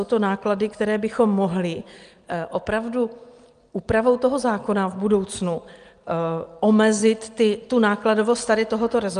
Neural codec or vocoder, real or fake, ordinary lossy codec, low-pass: none; real; Opus, 32 kbps; 9.9 kHz